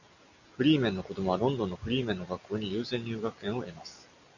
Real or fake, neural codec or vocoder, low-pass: real; none; 7.2 kHz